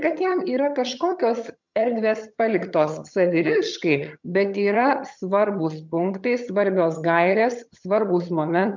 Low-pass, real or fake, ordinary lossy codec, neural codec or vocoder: 7.2 kHz; fake; MP3, 64 kbps; vocoder, 22.05 kHz, 80 mel bands, HiFi-GAN